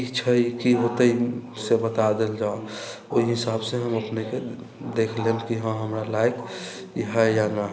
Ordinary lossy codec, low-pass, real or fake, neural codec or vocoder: none; none; real; none